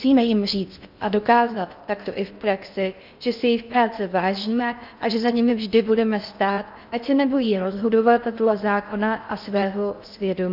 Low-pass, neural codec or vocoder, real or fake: 5.4 kHz; codec, 16 kHz in and 24 kHz out, 0.6 kbps, FocalCodec, streaming, 4096 codes; fake